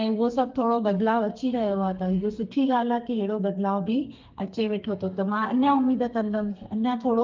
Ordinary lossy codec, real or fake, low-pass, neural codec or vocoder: Opus, 24 kbps; fake; 7.2 kHz; codec, 32 kHz, 1.9 kbps, SNAC